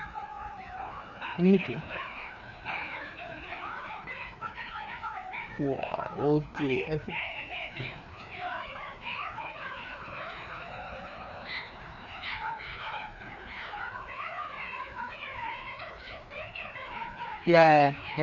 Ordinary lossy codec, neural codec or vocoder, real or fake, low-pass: none; codec, 16 kHz, 2 kbps, FreqCodec, larger model; fake; 7.2 kHz